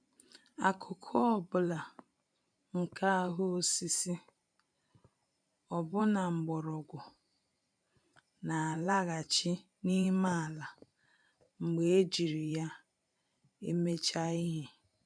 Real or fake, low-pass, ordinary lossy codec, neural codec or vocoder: fake; 9.9 kHz; none; vocoder, 44.1 kHz, 128 mel bands every 512 samples, BigVGAN v2